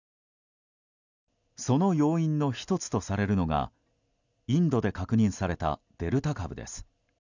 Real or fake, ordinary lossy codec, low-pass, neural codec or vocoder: real; none; 7.2 kHz; none